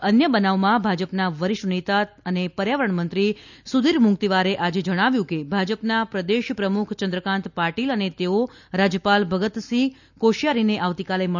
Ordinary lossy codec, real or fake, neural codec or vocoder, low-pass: none; real; none; 7.2 kHz